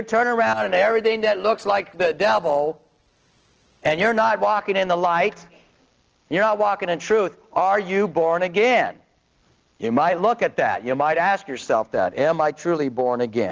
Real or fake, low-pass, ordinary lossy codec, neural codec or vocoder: real; 7.2 kHz; Opus, 16 kbps; none